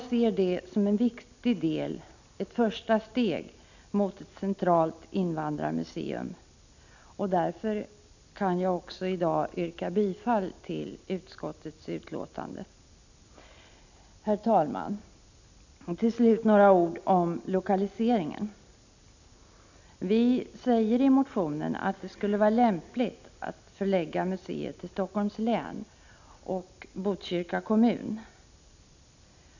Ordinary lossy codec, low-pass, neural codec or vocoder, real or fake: none; 7.2 kHz; none; real